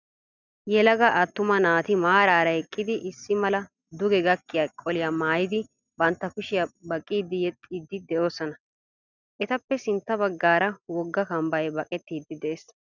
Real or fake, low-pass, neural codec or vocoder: real; 7.2 kHz; none